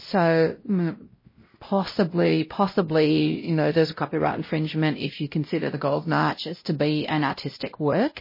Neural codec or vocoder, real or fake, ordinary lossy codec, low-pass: codec, 16 kHz, 0.5 kbps, X-Codec, WavLM features, trained on Multilingual LibriSpeech; fake; MP3, 24 kbps; 5.4 kHz